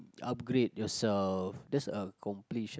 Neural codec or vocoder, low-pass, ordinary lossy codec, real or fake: none; none; none; real